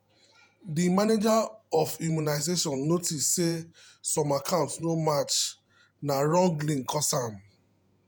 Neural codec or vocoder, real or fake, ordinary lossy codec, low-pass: vocoder, 48 kHz, 128 mel bands, Vocos; fake; none; none